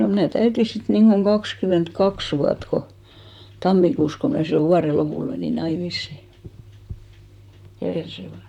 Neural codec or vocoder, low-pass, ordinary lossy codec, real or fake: vocoder, 44.1 kHz, 128 mel bands, Pupu-Vocoder; 19.8 kHz; none; fake